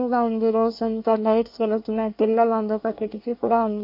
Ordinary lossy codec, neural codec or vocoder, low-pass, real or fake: MP3, 32 kbps; codec, 24 kHz, 1 kbps, SNAC; 5.4 kHz; fake